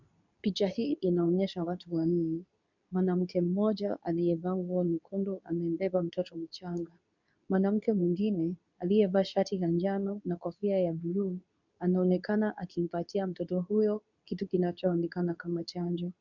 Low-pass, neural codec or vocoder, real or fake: 7.2 kHz; codec, 24 kHz, 0.9 kbps, WavTokenizer, medium speech release version 2; fake